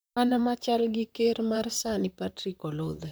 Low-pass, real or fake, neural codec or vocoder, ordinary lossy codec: none; fake; vocoder, 44.1 kHz, 128 mel bands, Pupu-Vocoder; none